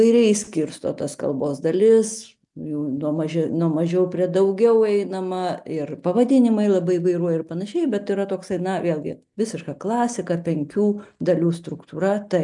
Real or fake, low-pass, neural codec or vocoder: real; 10.8 kHz; none